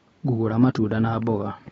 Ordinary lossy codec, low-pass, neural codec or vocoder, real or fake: AAC, 24 kbps; 10.8 kHz; none; real